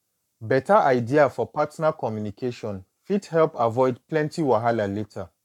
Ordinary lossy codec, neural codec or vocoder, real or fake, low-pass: none; codec, 44.1 kHz, 7.8 kbps, Pupu-Codec; fake; 19.8 kHz